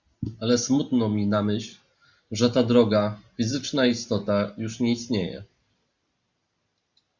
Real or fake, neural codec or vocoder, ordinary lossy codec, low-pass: real; none; Opus, 64 kbps; 7.2 kHz